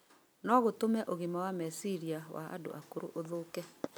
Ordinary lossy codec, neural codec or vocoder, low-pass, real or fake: none; none; none; real